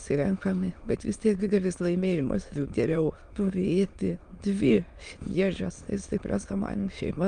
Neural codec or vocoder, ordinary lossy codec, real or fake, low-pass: autoencoder, 22.05 kHz, a latent of 192 numbers a frame, VITS, trained on many speakers; Opus, 32 kbps; fake; 9.9 kHz